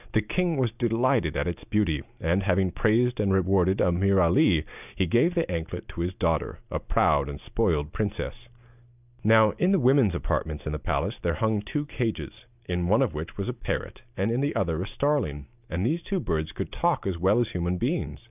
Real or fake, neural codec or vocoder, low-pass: real; none; 3.6 kHz